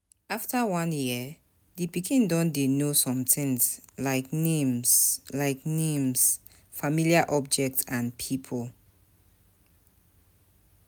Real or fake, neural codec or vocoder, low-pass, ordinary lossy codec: real; none; none; none